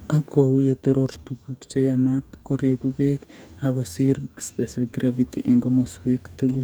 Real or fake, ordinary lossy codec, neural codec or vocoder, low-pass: fake; none; codec, 44.1 kHz, 2.6 kbps, DAC; none